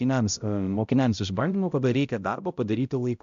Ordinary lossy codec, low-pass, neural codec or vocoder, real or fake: MP3, 64 kbps; 7.2 kHz; codec, 16 kHz, 0.5 kbps, X-Codec, HuBERT features, trained on balanced general audio; fake